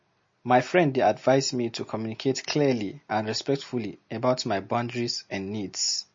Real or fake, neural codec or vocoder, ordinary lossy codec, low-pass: real; none; MP3, 32 kbps; 7.2 kHz